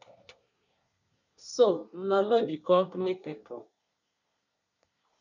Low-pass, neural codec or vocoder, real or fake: 7.2 kHz; codec, 24 kHz, 1 kbps, SNAC; fake